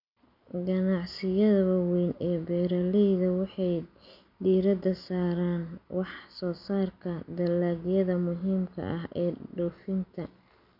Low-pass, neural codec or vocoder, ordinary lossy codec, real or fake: 5.4 kHz; none; none; real